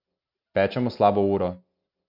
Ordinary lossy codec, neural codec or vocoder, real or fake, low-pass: none; none; real; 5.4 kHz